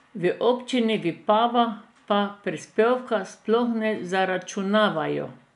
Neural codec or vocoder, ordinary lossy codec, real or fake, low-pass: none; none; real; 10.8 kHz